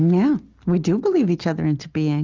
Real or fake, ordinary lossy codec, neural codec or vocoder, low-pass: real; Opus, 32 kbps; none; 7.2 kHz